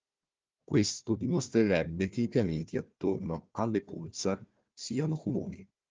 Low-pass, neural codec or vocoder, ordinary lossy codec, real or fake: 7.2 kHz; codec, 16 kHz, 1 kbps, FunCodec, trained on Chinese and English, 50 frames a second; Opus, 24 kbps; fake